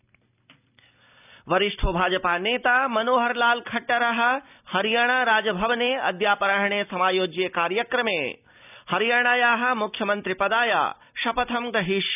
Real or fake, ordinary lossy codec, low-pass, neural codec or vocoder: real; none; 3.6 kHz; none